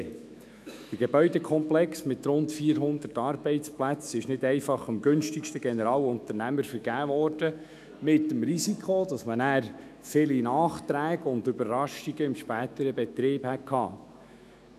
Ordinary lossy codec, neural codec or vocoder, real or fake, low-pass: none; autoencoder, 48 kHz, 128 numbers a frame, DAC-VAE, trained on Japanese speech; fake; 14.4 kHz